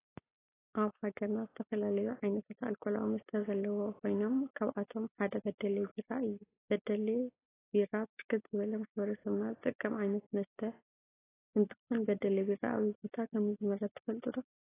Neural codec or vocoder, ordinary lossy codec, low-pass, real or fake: none; AAC, 16 kbps; 3.6 kHz; real